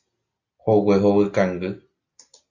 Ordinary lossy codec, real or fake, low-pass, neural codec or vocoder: Opus, 64 kbps; real; 7.2 kHz; none